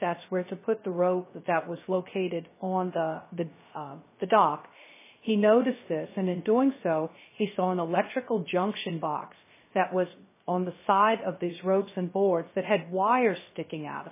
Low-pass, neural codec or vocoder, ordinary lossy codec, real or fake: 3.6 kHz; codec, 16 kHz, 0.3 kbps, FocalCodec; MP3, 16 kbps; fake